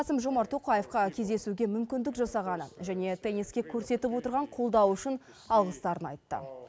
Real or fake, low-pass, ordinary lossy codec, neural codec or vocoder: real; none; none; none